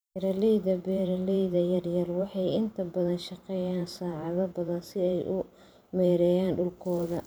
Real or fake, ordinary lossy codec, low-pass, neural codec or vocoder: fake; none; none; vocoder, 44.1 kHz, 128 mel bands every 512 samples, BigVGAN v2